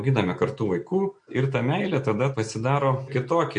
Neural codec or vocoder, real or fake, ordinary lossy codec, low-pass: none; real; MP3, 48 kbps; 9.9 kHz